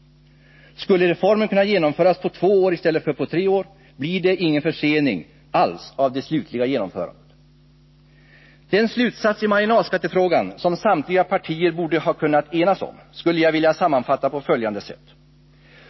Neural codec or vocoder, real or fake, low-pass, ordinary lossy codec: none; real; 7.2 kHz; MP3, 24 kbps